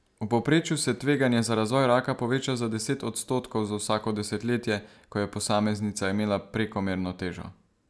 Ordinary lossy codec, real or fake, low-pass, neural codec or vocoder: none; real; none; none